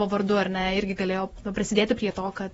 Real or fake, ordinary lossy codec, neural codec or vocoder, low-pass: fake; AAC, 24 kbps; vocoder, 48 kHz, 128 mel bands, Vocos; 19.8 kHz